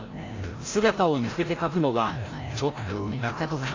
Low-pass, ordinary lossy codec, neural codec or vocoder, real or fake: 7.2 kHz; AAC, 32 kbps; codec, 16 kHz, 0.5 kbps, FreqCodec, larger model; fake